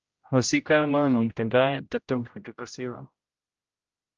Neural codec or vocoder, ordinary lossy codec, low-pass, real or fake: codec, 16 kHz, 0.5 kbps, X-Codec, HuBERT features, trained on general audio; Opus, 24 kbps; 7.2 kHz; fake